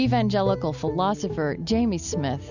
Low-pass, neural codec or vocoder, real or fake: 7.2 kHz; none; real